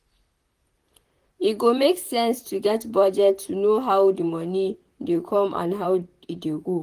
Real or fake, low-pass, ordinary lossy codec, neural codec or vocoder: fake; 14.4 kHz; Opus, 24 kbps; vocoder, 44.1 kHz, 128 mel bands, Pupu-Vocoder